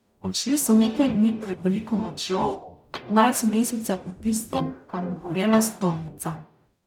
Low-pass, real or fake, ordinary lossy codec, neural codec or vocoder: 19.8 kHz; fake; none; codec, 44.1 kHz, 0.9 kbps, DAC